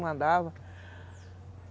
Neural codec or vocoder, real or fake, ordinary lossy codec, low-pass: none; real; none; none